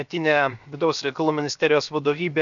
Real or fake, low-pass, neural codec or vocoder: fake; 7.2 kHz; codec, 16 kHz, 0.7 kbps, FocalCodec